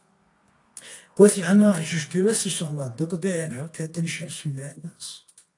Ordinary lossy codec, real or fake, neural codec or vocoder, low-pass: AAC, 48 kbps; fake; codec, 24 kHz, 0.9 kbps, WavTokenizer, medium music audio release; 10.8 kHz